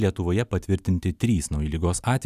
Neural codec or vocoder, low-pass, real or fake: none; 14.4 kHz; real